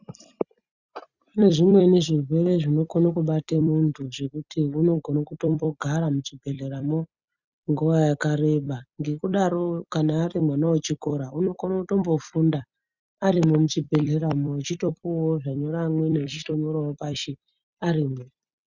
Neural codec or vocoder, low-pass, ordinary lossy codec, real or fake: vocoder, 44.1 kHz, 128 mel bands every 256 samples, BigVGAN v2; 7.2 kHz; Opus, 64 kbps; fake